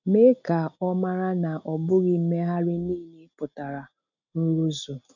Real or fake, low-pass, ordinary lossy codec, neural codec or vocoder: real; 7.2 kHz; none; none